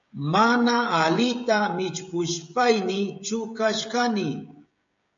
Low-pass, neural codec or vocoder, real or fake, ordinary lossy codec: 7.2 kHz; codec, 16 kHz, 16 kbps, FreqCodec, smaller model; fake; AAC, 64 kbps